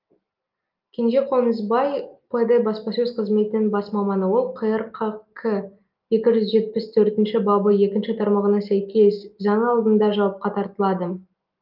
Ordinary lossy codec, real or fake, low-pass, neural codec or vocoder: Opus, 24 kbps; real; 5.4 kHz; none